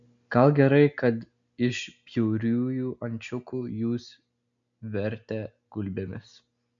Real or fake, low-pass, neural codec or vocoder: real; 7.2 kHz; none